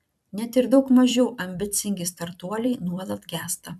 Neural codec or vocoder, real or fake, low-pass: vocoder, 44.1 kHz, 128 mel bands every 256 samples, BigVGAN v2; fake; 14.4 kHz